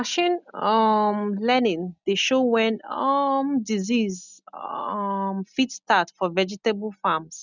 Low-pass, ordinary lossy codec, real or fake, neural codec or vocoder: 7.2 kHz; none; real; none